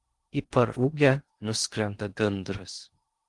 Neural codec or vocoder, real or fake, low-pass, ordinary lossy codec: codec, 16 kHz in and 24 kHz out, 0.6 kbps, FocalCodec, streaming, 2048 codes; fake; 10.8 kHz; Opus, 24 kbps